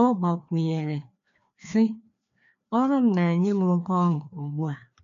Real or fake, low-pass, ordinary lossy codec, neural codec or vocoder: fake; 7.2 kHz; none; codec, 16 kHz, 2 kbps, FreqCodec, larger model